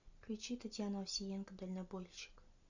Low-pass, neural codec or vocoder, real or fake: 7.2 kHz; none; real